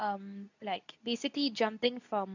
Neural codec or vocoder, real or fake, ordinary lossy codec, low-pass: codec, 24 kHz, 0.9 kbps, WavTokenizer, medium speech release version 2; fake; none; 7.2 kHz